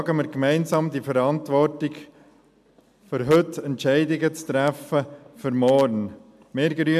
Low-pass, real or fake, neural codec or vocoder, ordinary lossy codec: 14.4 kHz; real; none; MP3, 96 kbps